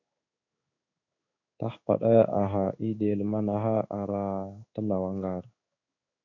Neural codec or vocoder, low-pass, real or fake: codec, 16 kHz in and 24 kHz out, 1 kbps, XY-Tokenizer; 7.2 kHz; fake